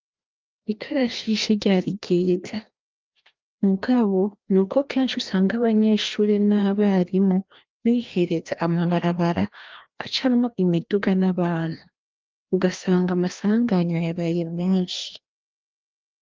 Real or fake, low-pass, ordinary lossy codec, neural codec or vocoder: fake; 7.2 kHz; Opus, 32 kbps; codec, 16 kHz, 1 kbps, FreqCodec, larger model